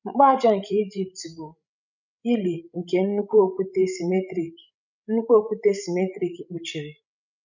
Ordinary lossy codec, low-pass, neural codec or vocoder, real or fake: none; 7.2 kHz; codec, 16 kHz, 16 kbps, FreqCodec, larger model; fake